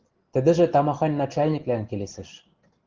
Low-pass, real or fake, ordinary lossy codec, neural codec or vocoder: 7.2 kHz; real; Opus, 16 kbps; none